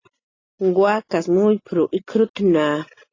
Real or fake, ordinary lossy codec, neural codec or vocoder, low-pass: real; AAC, 32 kbps; none; 7.2 kHz